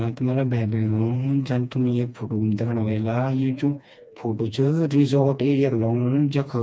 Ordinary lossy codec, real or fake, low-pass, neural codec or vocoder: none; fake; none; codec, 16 kHz, 2 kbps, FreqCodec, smaller model